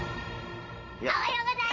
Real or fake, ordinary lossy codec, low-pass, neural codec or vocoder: fake; none; 7.2 kHz; vocoder, 44.1 kHz, 128 mel bands every 512 samples, BigVGAN v2